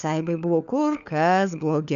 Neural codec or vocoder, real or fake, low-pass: codec, 16 kHz, 4 kbps, FunCodec, trained on LibriTTS, 50 frames a second; fake; 7.2 kHz